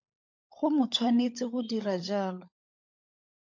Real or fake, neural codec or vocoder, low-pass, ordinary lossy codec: fake; codec, 16 kHz, 16 kbps, FunCodec, trained on LibriTTS, 50 frames a second; 7.2 kHz; MP3, 48 kbps